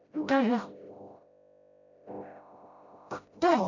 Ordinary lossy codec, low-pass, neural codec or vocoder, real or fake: none; 7.2 kHz; codec, 16 kHz, 0.5 kbps, FreqCodec, smaller model; fake